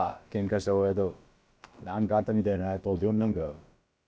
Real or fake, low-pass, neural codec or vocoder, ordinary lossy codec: fake; none; codec, 16 kHz, about 1 kbps, DyCAST, with the encoder's durations; none